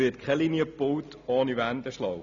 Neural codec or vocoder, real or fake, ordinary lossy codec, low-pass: none; real; none; 7.2 kHz